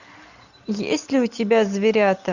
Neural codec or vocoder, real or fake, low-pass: none; real; 7.2 kHz